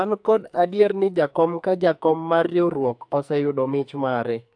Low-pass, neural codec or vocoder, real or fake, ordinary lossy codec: 9.9 kHz; codec, 44.1 kHz, 2.6 kbps, SNAC; fake; AAC, 64 kbps